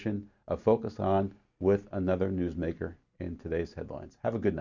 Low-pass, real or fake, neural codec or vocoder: 7.2 kHz; real; none